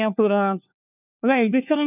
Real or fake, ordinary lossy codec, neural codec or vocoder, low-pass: fake; none; codec, 16 kHz, 1 kbps, FunCodec, trained on LibriTTS, 50 frames a second; 3.6 kHz